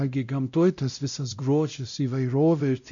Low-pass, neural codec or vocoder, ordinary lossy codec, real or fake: 7.2 kHz; codec, 16 kHz, 0.5 kbps, X-Codec, WavLM features, trained on Multilingual LibriSpeech; MP3, 96 kbps; fake